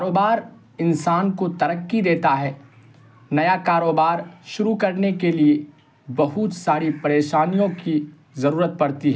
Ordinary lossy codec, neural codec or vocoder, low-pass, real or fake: none; none; none; real